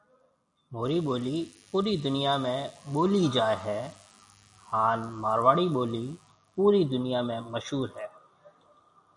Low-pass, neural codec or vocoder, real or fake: 10.8 kHz; none; real